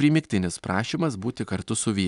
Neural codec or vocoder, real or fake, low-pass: none; real; 10.8 kHz